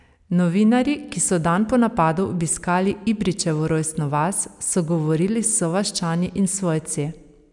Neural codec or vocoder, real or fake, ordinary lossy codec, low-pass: none; real; none; 10.8 kHz